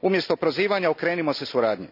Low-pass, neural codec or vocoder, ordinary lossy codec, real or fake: 5.4 kHz; none; none; real